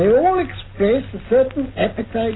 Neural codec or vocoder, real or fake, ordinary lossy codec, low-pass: none; real; AAC, 16 kbps; 7.2 kHz